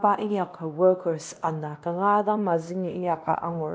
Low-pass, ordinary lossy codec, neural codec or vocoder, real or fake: none; none; codec, 16 kHz, 1 kbps, X-Codec, WavLM features, trained on Multilingual LibriSpeech; fake